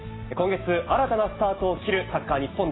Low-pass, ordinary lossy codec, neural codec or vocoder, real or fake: 7.2 kHz; AAC, 16 kbps; none; real